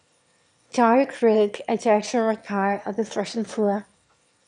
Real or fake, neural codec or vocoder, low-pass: fake; autoencoder, 22.05 kHz, a latent of 192 numbers a frame, VITS, trained on one speaker; 9.9 kHz